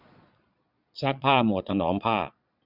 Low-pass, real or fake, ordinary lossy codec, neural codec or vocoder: 5.4 kHz; fake; none; vocoder, 22.05 kHz, 80 mel bands, Vocos